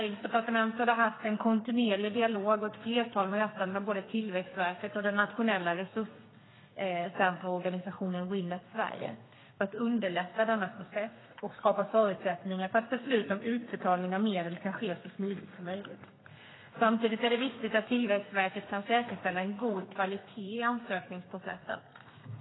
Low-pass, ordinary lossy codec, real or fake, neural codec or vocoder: 7.2 kHz; AAC, 16 kbps; fake; codec, 32 kHz, 1.9 kbps, SNAC